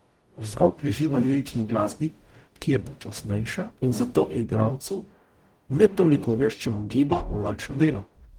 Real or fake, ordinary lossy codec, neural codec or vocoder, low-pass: fake; Opus, 24 kbps; codec, 44.1 kHz, 0.9 kbps, DAC; 19.8 kHz